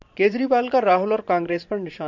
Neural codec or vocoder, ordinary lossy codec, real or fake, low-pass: vocoder, 44.1 kHz, 128 mel bands every 512 samples, BigVGAN v2; MP3, 64 kbps; fake; 7.2 kHz